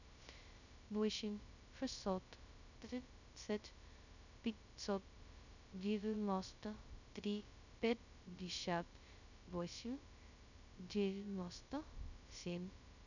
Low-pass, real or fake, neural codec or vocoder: 7.2 kHz; fake; codec, 16 kHz, 0.2 kbps, FocalCodec